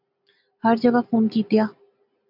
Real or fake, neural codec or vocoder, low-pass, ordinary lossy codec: real; none; 5.4 kHz; MP3, 48 kbps